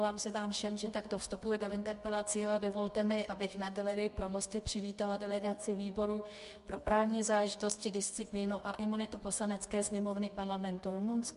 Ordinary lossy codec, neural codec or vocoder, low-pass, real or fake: MP3, 64 kbps; codec, 24 kHz, 0.9 kbps, WavTokenizer, medium music audio release; 10.8 kHz; fake